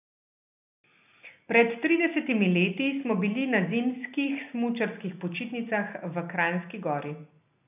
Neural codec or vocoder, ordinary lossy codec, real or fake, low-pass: none; none; real; 3.6 kHz